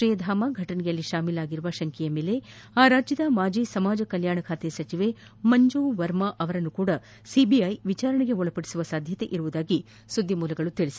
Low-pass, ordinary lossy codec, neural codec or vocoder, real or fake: none; none; none; real